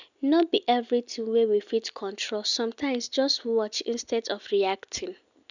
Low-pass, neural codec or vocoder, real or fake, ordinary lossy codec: 7.2 kHz; none; real; none